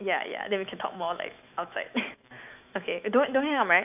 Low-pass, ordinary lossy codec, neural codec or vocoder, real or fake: 3.6 kHz; none; autoencoder, 48 kHz, 128 numbers a frame, DAC-VAE, trained on Japanese speech; fake